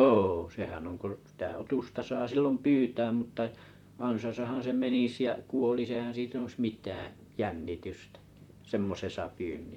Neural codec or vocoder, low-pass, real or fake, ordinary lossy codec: vocoder, 44.1 kHz, 128 mel bands, Pupu-Vocoder; 19.8 kHz; fake; MP3, 96 kbps